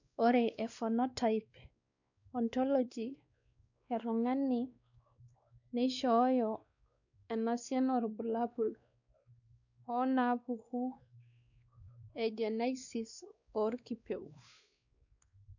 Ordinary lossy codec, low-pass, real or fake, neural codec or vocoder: none; 7.2 kHz; fake; codec, 16 kHz, 2 kbps, X-Codec, WavLM features, trained on Multilingual LibriSpeech